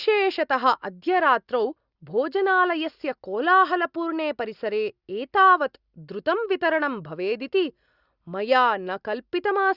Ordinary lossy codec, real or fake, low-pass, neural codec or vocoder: Opus, 64 kbps; real; 5.4 kHz; none